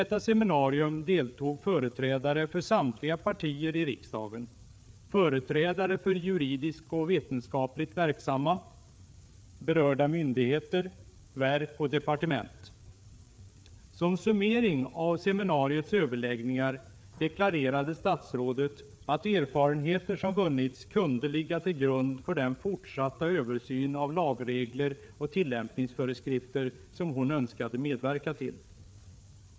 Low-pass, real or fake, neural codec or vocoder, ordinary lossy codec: none; fake; codec, 16 kHz, 4 kbps, FreqCodec, larger model; none